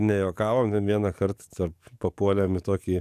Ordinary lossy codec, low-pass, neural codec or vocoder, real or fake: AAC, 96 kbps; 14.4 kHz; codec, 44.1 kHz, 7.8 kbps, DAC; fake